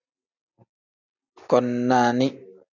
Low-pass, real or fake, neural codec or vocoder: 7.2 kHz; real; none